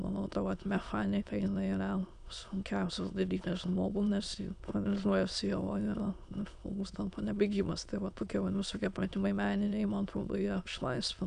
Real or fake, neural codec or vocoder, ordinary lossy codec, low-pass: fake; autoencoder, 22.05 kHz, a latent of 192 numbers a frame, VITS, trained on many speakers; MP3, 96 kbps; 9.9 kHz